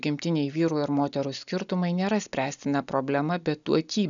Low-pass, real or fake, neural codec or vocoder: 7.2 kHz; real; none